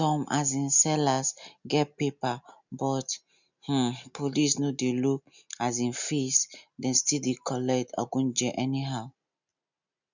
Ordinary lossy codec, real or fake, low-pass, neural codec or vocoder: none; real; 7.2 kHz; none